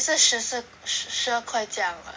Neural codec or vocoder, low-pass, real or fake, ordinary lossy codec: none; none; real; none